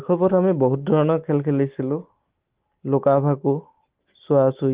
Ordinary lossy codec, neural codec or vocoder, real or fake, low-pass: Opus, 16 kbps; none; real; 3.6 kHz